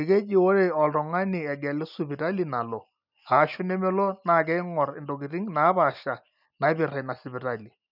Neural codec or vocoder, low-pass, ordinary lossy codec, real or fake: none; 5.4 kHz; none; real